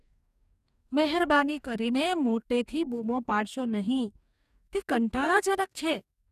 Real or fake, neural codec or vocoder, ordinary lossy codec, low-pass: fake; codec, 44.1 kHz, 2.6 kbps, DAC; none; 14.4 kHz